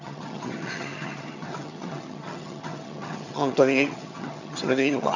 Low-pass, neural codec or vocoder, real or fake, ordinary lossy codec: 7.2 kHz; vocoder, 22.05 kHz, 80 mel bands, HiFi-GAN; fake; none